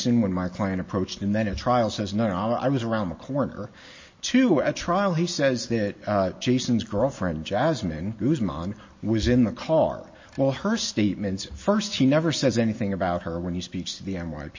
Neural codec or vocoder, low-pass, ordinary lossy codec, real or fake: none; 7.2 kHz; MP3, 32 kbps; real